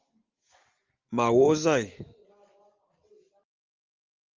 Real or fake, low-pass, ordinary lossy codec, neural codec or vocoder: real; 7.2 kHz; Opus, 24 kbps; none